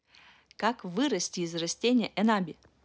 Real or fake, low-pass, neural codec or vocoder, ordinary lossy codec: real; none; none; none